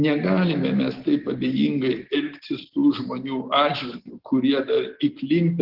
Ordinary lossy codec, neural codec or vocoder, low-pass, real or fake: Opus, 16 kbps; none; 5.4 kHz; real